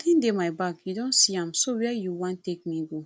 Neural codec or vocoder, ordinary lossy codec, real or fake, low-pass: none; none; real; none